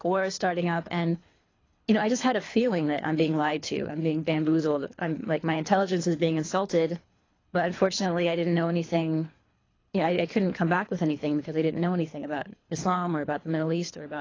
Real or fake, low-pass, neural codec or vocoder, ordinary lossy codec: fake; 7.2 kHz; codec, 24 kHz, 3 kbps, HILCodec; AAC, 32 kbps